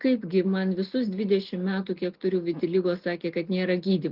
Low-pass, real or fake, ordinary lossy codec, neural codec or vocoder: 5.4 kHz; real; Opus, 32 kbps; none